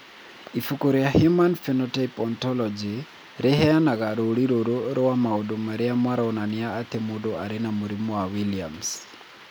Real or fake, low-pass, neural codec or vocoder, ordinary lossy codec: real; none; none; none